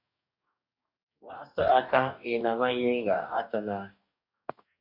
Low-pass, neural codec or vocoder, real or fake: 5.4 kHz; codec, 44.1 kHz, 2.6 kbps, DAC; fake